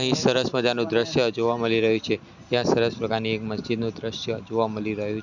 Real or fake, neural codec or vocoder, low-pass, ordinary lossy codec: real; none; 7.2 kHz; none